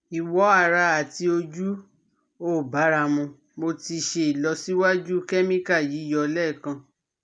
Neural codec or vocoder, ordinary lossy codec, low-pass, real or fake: none; none; 9.9 kHz; real